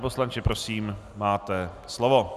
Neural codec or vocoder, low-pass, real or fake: none; 14.4 kHz; real